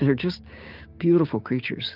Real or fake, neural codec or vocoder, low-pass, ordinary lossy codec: fake; codec, 16 kHz, 6 kbps, DAC; 5.4 kHz; Opus, 24 kbps